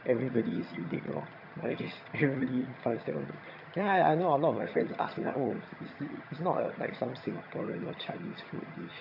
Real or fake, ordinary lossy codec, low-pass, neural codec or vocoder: fake; none; 5.4 kHz; vocoder, 22.05 kHz, 80 mel bands, HiFi-GAN